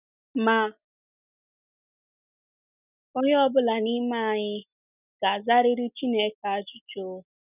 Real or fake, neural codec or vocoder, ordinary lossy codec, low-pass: real; none; none; 3.6 kHz